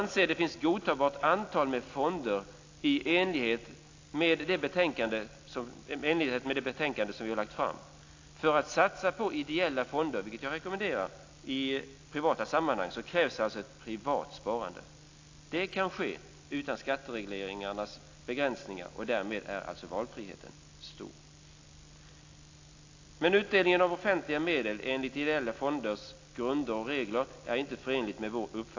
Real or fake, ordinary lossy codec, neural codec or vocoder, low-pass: real; AAC, 48 kbps; none; 7.2 kHz